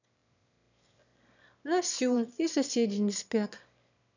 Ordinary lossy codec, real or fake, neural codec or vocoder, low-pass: none; fake; autoencoder, 22.05 kHz, a latent of 192 numbers a frame, VITS, trained on one speaker; 7.2 kHz